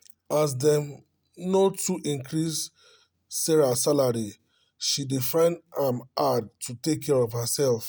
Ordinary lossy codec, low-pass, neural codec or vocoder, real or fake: none; none; none; real